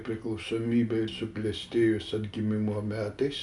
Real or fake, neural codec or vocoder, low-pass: real; none; 10.8 kHz